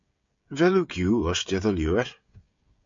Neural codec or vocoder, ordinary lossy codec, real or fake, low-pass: codec, 16 kHz, 16 kbps, FreqCodec, smaller model; MP3, 48 kbps; fake; 7.2 kHz